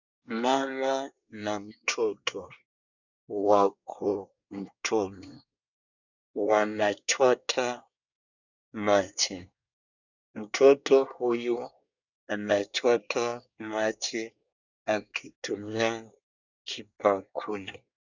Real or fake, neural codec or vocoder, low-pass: fake; codec, 24 kHz, 1 kbps, SNAC; 7.2 kHz